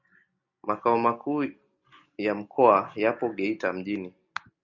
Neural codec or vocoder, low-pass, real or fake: none; 7.2 kHz; real